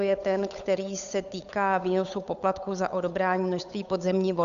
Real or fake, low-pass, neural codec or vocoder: fake; 7.2 kHz; codec, 16 kHz, 8 kbps, FunCodec, trained on Chinese and English, 25 frames a second